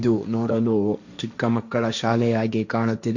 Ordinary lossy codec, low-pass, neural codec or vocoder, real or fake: none; 7.2 kHz; codec, 16 kHz, 1.1 kbps, Voila-Tokenizer; fake